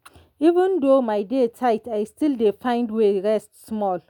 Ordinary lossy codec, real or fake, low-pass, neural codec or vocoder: none; real; 19.8 kHz; none